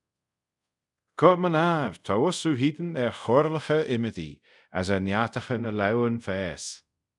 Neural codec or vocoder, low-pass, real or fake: codec, 24 kHz, 0.5 kbps, DualCodec; 10.8 kHz; fake